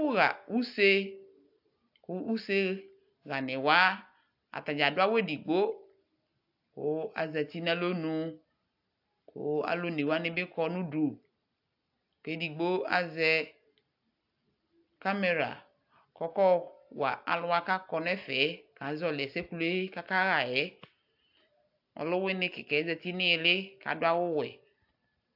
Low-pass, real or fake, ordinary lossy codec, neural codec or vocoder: 5.4 kHz; real; AAC, 48 kbps; none